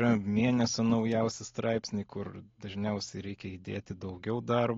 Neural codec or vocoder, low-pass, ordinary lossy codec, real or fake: none; 7.2 kHz; AAC, 32 kbps; real